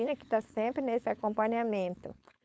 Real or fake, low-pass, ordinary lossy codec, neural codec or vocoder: fake; none; none; codec, 16 kHz, 4.8 kbps, FACodec